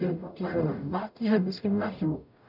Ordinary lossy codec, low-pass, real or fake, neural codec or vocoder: none; 5.4 kHz; fake; codec, 44.1 kHz, 0.9 kbps, DAC